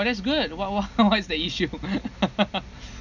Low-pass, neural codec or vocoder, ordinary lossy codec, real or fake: 7.2 kHz; none; none; real